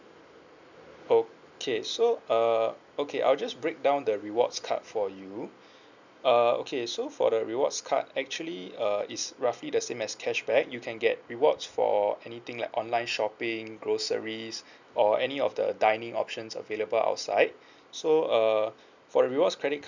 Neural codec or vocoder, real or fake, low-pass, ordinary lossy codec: none; real; 7.2 kHz; none